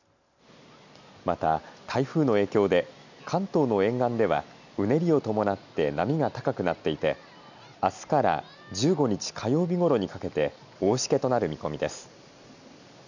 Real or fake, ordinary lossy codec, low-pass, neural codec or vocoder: real; none; 7.2 kHz; none